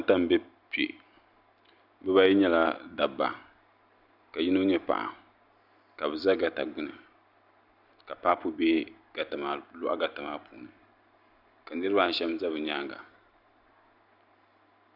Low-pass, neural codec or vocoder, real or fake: 5.4 kHz; none; real